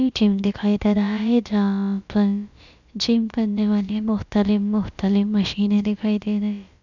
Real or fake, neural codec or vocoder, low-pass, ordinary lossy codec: fake; codec, 16 kHz, about 1 kbps, DyCAST, with the encoder's durations; 7.2 kHz; none